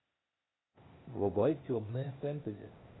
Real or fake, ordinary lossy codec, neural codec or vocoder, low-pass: fake; AAC, 16 kbps; codec, 16 kHz, 0.8 kbps, ZipCodec; 7.2 kHz